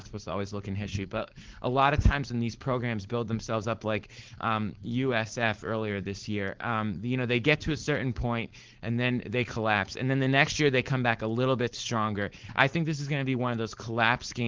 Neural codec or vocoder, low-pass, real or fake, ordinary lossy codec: codec, 16 kHz, 4.8 kbps, FACodec; 7.2 kHz; fake; Opus, 16 kbps